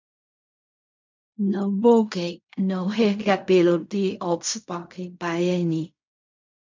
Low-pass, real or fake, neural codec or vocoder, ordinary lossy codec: 7.2 kHz; fake; codec, 16 kHz in and 24 kHz out, 0.4 kbps, LongCat-Audio-Codec, fine tuned four codebook decoder; MP3, 64 kbps